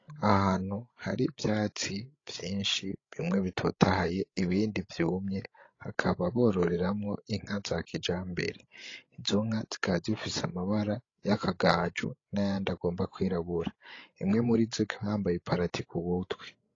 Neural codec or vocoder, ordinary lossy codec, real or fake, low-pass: codec, 16 kHz, 16 kbps, FreqCodec, larger model; AAC, 32 kbps; fake; 7.2 kHz